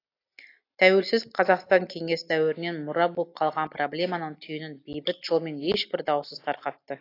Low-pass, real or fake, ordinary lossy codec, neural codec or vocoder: 5.4 kHz; real; AAC, 32 kbps; none